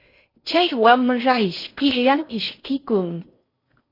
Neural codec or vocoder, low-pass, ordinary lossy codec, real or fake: codec, 16 kHz in and 24 kHz out, 0.6 kbps, FocalCodec, streaming, 4096 codes; 5.4 kHz; AAC, 32 kbps; fake